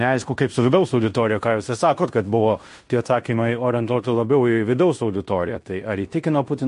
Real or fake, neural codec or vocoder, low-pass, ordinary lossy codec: fake; codec, 16 kHz in and 24 kHz out, 0.9 kbps, LongCat-Audio-Codec, fine tuned four codebook decoder; 10.8 kHz; MP3, 48 kbps